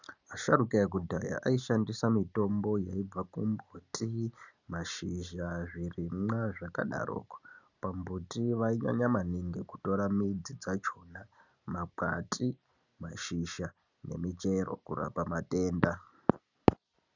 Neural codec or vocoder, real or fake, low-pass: none; real; 7.2 kHz